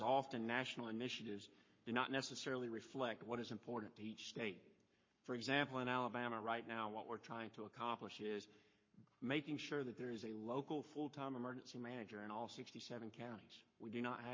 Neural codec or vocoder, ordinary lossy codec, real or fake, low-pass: codec, 44.1 kHz, 7.8 kbps, Pupu-Codec; MP3, 32 kbps; fake; 7.2 kHz